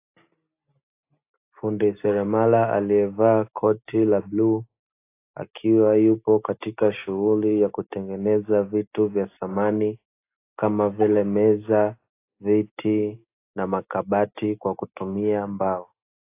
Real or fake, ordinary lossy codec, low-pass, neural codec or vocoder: real; AAC, 24 kbps; 3.6 kHz; none